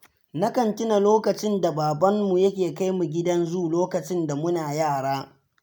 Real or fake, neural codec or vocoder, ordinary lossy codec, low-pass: real; none; none; none